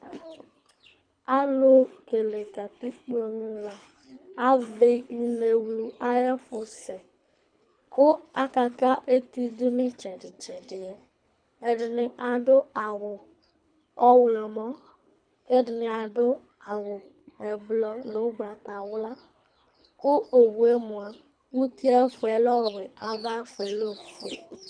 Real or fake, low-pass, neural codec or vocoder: fake; 9.9 kHz; codec, 24 kHz, 3 kbps, HILCodec